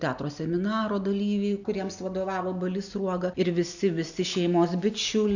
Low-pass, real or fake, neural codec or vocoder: 7.2 kHz; real; none